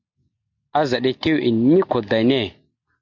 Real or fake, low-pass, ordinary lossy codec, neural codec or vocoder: real; 7.2 kHz; MP3, 64 kbps; none